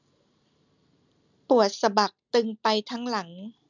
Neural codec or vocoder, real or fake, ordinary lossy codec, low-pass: none; real; none; 7.2 kHz